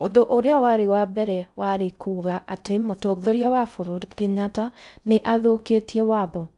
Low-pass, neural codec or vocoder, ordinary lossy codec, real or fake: 10.8 kHz; codec, 16 kHz in and 24 kHz out, 0.6 kbps, FocalCodec, streaming, 4096 codes; none; fake